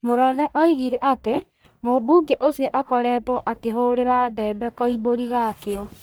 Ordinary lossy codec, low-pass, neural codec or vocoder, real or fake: none; none; codec, 44.1 kHz, 1.7 kbps, Pupu-Codec; fake